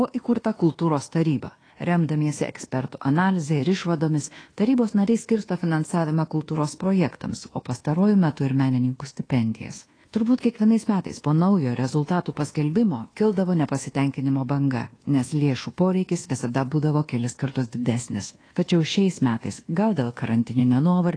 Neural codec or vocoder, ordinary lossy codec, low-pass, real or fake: codec, 24 kHz, 1.2 kbps, DualCodec; AAC, 32 kbps; 9.9 kHz; fake